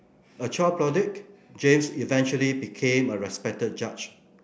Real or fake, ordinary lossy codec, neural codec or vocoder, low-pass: real; none; none; none